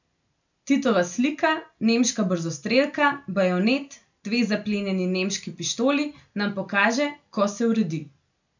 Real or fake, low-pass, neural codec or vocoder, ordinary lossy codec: real; 7.2 kHz; none; none